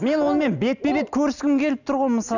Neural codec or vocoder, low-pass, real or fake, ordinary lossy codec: none; 7.2 kHz; real; none